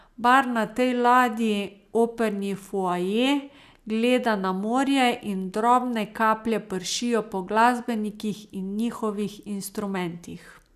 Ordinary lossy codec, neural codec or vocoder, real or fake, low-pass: none; none; real; 14.4 kHz